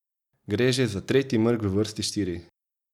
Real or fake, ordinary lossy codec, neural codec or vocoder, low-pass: real; none; none; 19.8 kHz